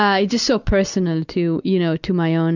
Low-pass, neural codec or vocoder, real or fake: 7.2 kHz; none; real